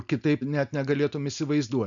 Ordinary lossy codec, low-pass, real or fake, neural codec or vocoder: MP3, 96 kbps; 7.2 kHz; real; none